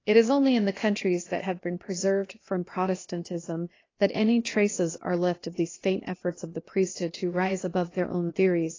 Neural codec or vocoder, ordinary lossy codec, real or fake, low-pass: codec, 16 kHz, 0.8 kbps, ZipCodec; AAC, 32 kbps; fake; 7.2 kHz